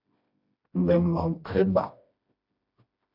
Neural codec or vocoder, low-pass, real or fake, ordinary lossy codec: codec, 16 kHz, 1 kbps, FreqCodec, smaller model; 5.4 kHz; fake; AAC, 48 kbps